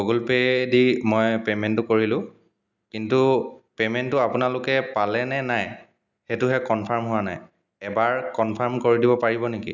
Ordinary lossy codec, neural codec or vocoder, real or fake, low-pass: none; none; real; 7.2 kHz